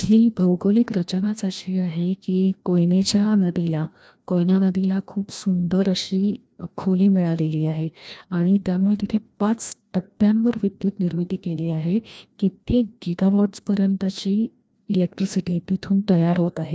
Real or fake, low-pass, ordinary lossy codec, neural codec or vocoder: fake; none; none; codec, 16 kHz, 1 kbps, FreqCodec, larger model